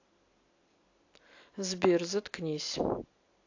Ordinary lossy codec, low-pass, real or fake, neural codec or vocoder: AAC, 48 kbps; 7.2 kHz; real; none